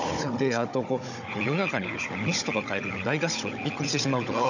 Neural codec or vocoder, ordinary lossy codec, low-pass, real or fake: codec, 16 kHz, 16 kbps, FunCodec, trained on Chinese and English, 50 frames a second; none; 7.2 kHz; fake